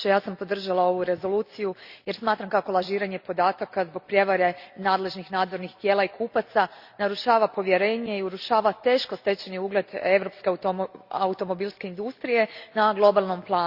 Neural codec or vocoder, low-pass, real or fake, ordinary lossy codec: none; 5.4 kHz; real; Opus, 64 kbps